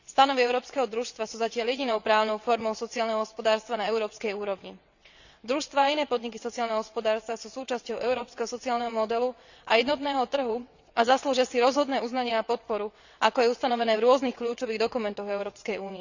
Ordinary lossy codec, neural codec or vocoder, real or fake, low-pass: none; vocoder, 22.05 kHz, 80 mel bands, WaveNeXt; fake; 7.2 kHz